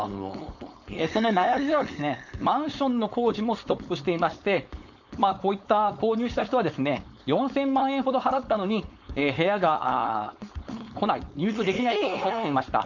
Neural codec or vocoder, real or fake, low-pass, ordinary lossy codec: codec, 16 kHz, 4.8 kbps, FACodec; fake; 7.2 kHz; none